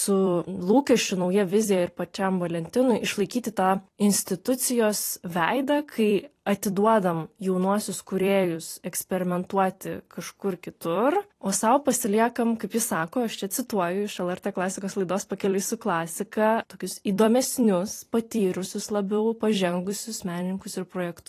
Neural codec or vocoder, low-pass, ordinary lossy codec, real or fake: vocoder, 44.1 kHz, 128 mel bands every 256 samples, BigVGAN v2; 14.4 kHz; AAC, 48 kbps; fake